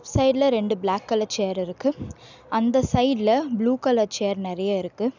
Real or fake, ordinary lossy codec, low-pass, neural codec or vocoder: real; none; 7.2 kHz; none